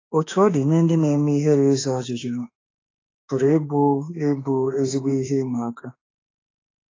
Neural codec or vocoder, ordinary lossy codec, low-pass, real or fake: autoencoder, 48 kHz, 32 numbers a frame, DAC-VAE, trained on Japanese speech; AAC, 32 kbps; 7.2 kHz; fake